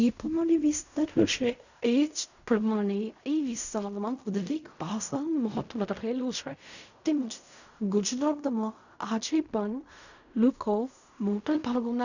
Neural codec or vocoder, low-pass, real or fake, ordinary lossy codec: codec, 16 kHz in and 24 kHz out, 0.4 kbps, LongCat-Audio-Codec, fine tuned four codebook decoder; 7.2 kHz; fake; none